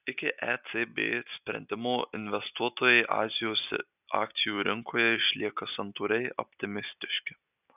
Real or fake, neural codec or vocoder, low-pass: real; none; 3.6 kHz